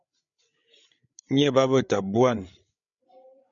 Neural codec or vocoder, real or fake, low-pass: codec, 16 kHz, 8 kbps, FreqCodec, larger model; fake; 7.2 kHz